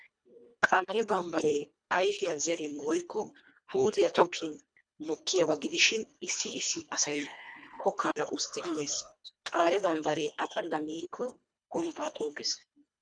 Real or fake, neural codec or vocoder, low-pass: fake; codec, 24 kHz, 1.5 kbps, HILCodec; 9.9 kHz